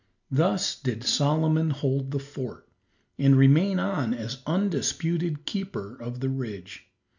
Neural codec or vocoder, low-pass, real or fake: none; 7.2 kHz; real